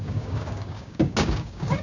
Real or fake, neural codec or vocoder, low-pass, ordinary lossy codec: fake; codec, 16 kHz, 6 kbps, DAC; 7.2 kHz; none